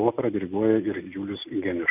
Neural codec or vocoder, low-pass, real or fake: none; 3.6 kHz; real